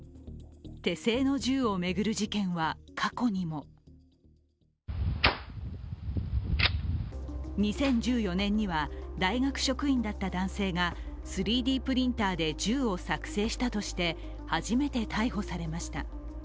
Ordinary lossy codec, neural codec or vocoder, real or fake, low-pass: none; none; real; none